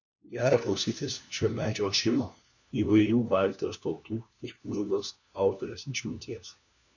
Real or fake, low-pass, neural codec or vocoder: fake; 7.2 kHz; codec, 16 kHz, 1 kbps, FunCodec, trained on LibriTTS, 50 frames a second